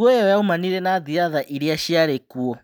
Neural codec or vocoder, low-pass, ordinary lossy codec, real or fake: none; none; none; real